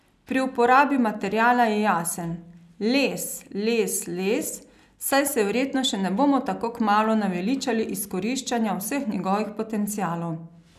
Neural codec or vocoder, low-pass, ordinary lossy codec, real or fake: none; 14.4 kHz; none; real